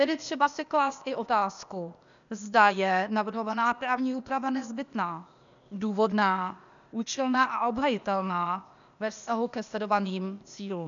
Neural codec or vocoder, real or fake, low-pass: codec, 16 kHz, 0.8 kbps, ZipCodec; fake; 7.2 kHz